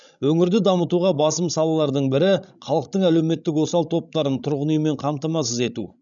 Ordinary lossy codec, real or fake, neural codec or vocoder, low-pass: none; fake; codec, 16 kHz, 16 kbps, FreqCodec, larger model; 7.2 kHz